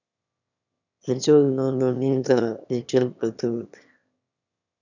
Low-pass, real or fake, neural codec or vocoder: 7.2 kHz; fake; autoencoder, 22.05 kHz, a latent of 192 numbers a frame, VITS, trained on one speaker